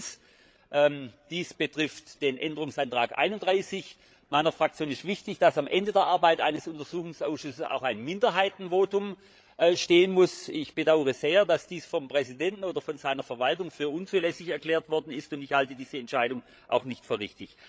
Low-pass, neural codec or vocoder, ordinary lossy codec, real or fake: none; codec, 16 kHz, 8 kbps, FreqCodec, larger model; none; fake